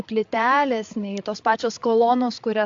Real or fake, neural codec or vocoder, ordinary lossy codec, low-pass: fake; codec, 16 kHz, 8 kbps, FreqCodec, larger model; AAC, 64 kbps; 7.2 kHz